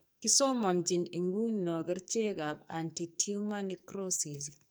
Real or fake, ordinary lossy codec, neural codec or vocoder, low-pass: fake; none; codec, 44.1 kHz, 2.6 kbps, SNAC; none